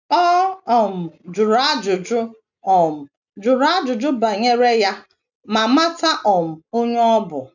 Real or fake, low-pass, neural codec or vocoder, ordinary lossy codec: real; 7.2 kHz; none; none